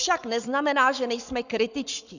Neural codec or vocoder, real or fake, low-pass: codec, 44.1 kHz, 7.8 kbps, Pupu-Codec; fake; 7.2 kHz